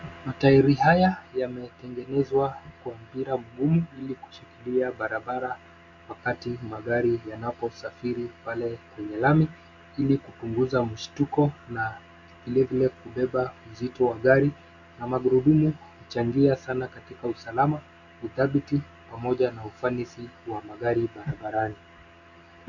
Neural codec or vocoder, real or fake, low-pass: none; real; 7.2 kHz